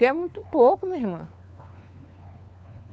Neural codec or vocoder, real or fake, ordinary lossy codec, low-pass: codec, 16 kHz, 4 kbps, FunCodec, trained on LibriTTS, 50 frames a second; fake; none; none